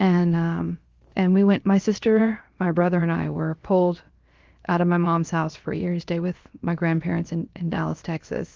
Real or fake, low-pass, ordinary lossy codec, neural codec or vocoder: fake; 7.2 kHz; Opus, 32 kbps; codec, 16 kHz, 0.7 kbps, FocalCodec